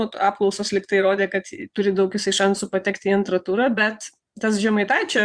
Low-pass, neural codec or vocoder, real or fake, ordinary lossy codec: 9.9 kHz; vocoder, 22.05 kHz, 80 mel bands, Vocos; fake; Opus, 64 kbps